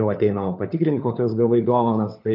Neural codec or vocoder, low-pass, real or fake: codec, 16 kHz, 4 kbps, FunCodec, trained on LibriTTS, 50 frames a second; 5.4 kHz; fake